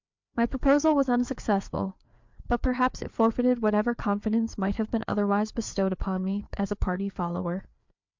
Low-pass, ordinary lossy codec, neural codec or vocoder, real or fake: 7.2 kHz; MP3, 64 kbps; codec, 16 kHz, 4 kbps, FreqCodec, larger model; fake